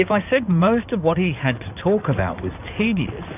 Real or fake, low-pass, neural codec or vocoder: fake; 3.6 kHz; codec, 16 kHz in and 24 kHz out, 2.2 kbps, FireRedTTS-2 codec